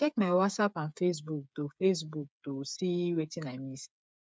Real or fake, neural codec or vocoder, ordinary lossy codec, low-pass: fake; codec, 16 kHz, 8 kbps, FreqCodec, smaller model; none; none